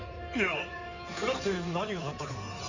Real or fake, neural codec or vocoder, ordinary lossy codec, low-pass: fake; codec, 16 kHz in and 24 kHz out, 2.2 kbps, FireRedTTS-2 codec; none; 7.2 kHz